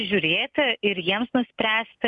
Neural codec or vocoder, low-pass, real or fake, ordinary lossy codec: none; 10.8 kHz; real; MP3, 96 kbps